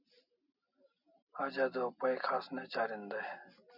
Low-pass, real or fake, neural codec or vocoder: 5.4 kHz; real; none